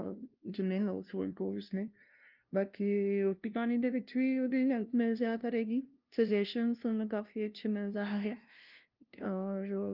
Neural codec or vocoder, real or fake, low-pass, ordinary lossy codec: codec, 16 kHz, 0.5 kbps, FunCodec, trained on LibriTTS, 25 frames a second; fake; 5.4 kHz; Opus, 24 kbps